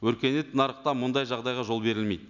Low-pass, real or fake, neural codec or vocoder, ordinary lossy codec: 7.2 kHz; real; none; none